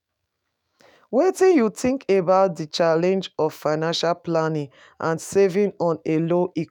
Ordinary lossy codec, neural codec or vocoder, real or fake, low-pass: none; autoencoder, 48 kHz, 128 numbers a frame, DAC-VAE, trained on Japanese speech; fake; 19.8 kHz